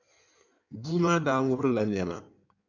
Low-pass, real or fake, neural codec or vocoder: 7.2 kHz; fake; codec, 16 kHz in and 24 kHz out, 1.1 kbps, FireRedTTS-2 codec